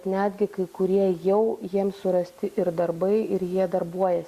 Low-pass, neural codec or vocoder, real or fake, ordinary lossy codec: 14.4 kHz; none; real; Opus, 64 kbps